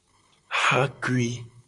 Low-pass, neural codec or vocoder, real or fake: 10.8 kHz; vocoder, 44.1 kHz, 128 mel bands, Pupu-Vocoder; fake